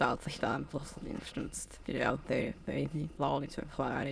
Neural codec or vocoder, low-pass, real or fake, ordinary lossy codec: autoencoder, 22.05 kHz, a latent of 192 numbers a frame, VITS, trained on many speakers; none; fake; none